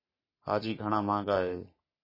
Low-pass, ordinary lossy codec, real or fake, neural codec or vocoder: 5.4 kHz; MP3, 24 kbps; fake; codec, 44.1 kHz, 3.4 kbps, Pupu-Codec